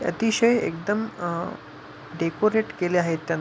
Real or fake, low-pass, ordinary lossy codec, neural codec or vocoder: real; none; none; none